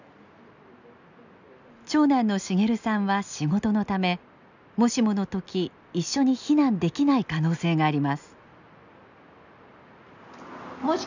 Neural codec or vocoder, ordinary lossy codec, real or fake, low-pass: none; none; real; 7.2 kHz